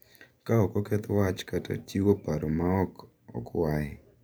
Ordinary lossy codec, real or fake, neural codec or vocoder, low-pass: none; fake; vocoder, 44.1 kHz, 128 mel bands every 512 samples, BigVGAN v2; none